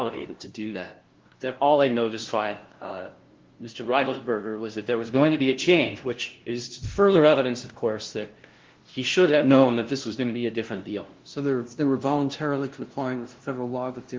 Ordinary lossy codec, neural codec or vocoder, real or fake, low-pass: Opus, 16 kbps; codec, 16 kHz, 0.5 kbps, FunCodec, trained on LibriTTS, 25 frames a second; fake; 7.2 kHz